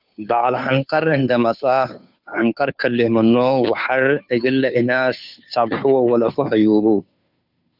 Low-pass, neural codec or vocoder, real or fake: 5.4 kHz; codec, 16 kHz, 2 kbps, FunCodec, trained on Chinese and English, 25 frames a second; fake